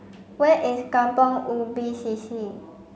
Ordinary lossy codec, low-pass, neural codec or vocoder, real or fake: none; none; none; real